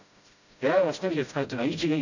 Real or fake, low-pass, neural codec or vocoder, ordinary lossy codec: fake; 7.2 kHz; codec, 16 kHz, 0.5 kbps, FreqCodec, smaller model; none